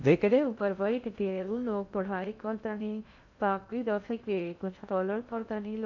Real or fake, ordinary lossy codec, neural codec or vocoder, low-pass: fake; none; codec, 16 kHz in and 24 kHz out, 0.6 kbps, FocalCodec, streaming, 2048 codes; 7.2 kHz